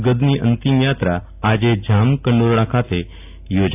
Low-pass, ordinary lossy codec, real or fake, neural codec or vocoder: 3.6 kHz; AAC, 32 kbps; real; none